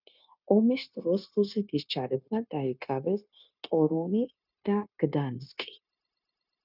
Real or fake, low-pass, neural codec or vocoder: fake; 5.4 kHz; codec, 16 kHz, 0.9 kbps, LongCat-Audio-Codec